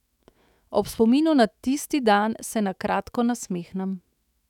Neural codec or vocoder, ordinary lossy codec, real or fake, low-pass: autoencoder, 48 kHz, 128 numbers a frame, DAC-VAE, trained on Japanese speech; none; fake; 19.8 kHz